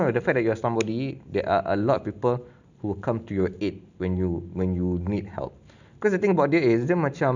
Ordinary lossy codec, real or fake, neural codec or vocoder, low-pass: none; real; none; 7.2 kHz